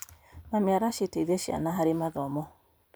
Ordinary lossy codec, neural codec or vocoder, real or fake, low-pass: none; vocoder, 44.1 kHz, 128 mel bands every 512 samples, BigVGAN v2; fake; none